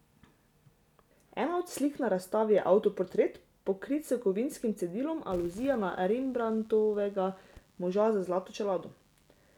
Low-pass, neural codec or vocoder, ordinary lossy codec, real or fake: 19.8 kHz; none; none; real